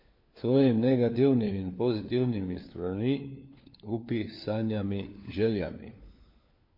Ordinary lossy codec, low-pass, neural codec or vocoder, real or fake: MP3, 32 kbps; 5.4 kHz; codec, 16 kHz, 4 kbps, FunCodec, trained on LibriTTS, 50 frames a second; fake